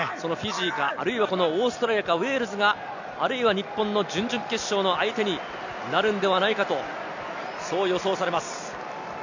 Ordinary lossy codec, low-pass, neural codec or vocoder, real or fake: none; 7.2 kHz; none; real